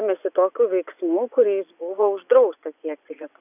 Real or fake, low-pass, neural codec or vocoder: fake; 3.6 kHz; vocoder, 24 kHz, 100 mel bands, Vocos